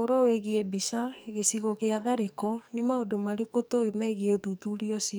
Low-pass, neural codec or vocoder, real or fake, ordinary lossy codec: none; codec, 44.1 kHz, 2.6 kbps, SNAC; fake; none